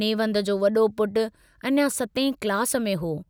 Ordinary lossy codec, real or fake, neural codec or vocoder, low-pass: none; real; none; none